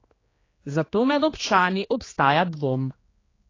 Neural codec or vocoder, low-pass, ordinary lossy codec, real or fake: codec, 16 kHz, 2 kbps, X-Codec, HuBERT features, trained on general audio; 7.2 kHz; AAC, 32 kbps; fake